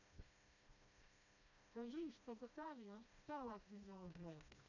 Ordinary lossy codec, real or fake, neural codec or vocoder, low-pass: none; fake; codec, 16 kHz, 1 kbps, FreqCodec, smaller model; 7.2 kHz